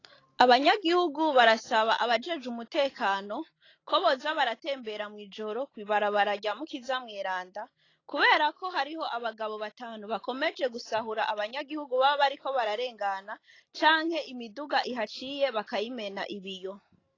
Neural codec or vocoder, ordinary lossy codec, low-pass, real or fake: none; AAC, 32 kbps; 7.2 kHz; real